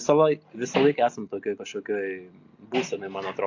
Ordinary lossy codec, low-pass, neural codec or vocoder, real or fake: AAC, 48 kbps; 7.2 kHz; none; real